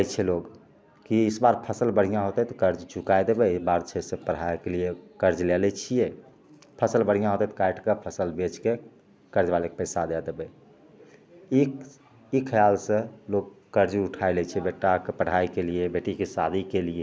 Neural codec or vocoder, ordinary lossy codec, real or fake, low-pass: none; none; real; none